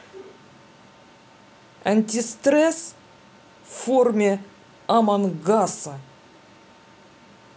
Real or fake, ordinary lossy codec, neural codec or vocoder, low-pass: real; none; none; none